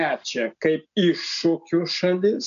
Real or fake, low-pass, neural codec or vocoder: real; 7.2 kHz; none